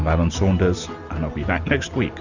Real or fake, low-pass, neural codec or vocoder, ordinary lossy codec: real; 7.2 kHz; none; Opus, 64 kbps